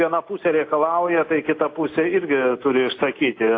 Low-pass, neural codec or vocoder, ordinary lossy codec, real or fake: 7.2 kHz; none; AAC, 32 kbps; real